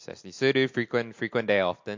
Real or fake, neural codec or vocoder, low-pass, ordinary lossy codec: real; none; 7.2 kHz; MP3, 48 kbps